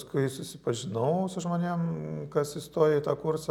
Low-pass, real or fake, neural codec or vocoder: 19.8 kHz; real; none